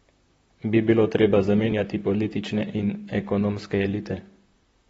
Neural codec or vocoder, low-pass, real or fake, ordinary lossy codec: vocoder, 44.1 kHz, 128 mel bands, Pupu-Vocoder; 19.8 kHz; fake; AAC, 24 kbps